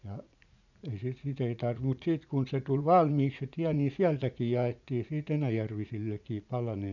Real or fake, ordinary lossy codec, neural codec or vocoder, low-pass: fake; none; vocoder, 44.1 kHz, 80 mel bands, Vocos; 7.2 kHz